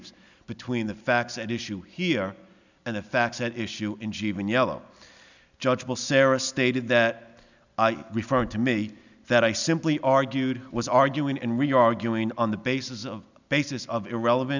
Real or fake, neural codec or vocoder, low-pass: real; none; 7.2 kHz